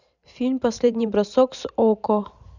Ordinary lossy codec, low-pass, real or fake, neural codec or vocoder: none; 7.2 kHz; fake; vocoder, 44.1 kHz, 128 mel bands every 512 samples, BigVGAN v2